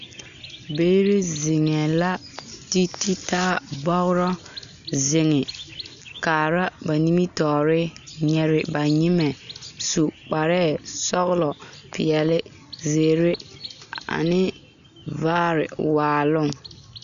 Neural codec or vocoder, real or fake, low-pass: none; real; 7.2 kHz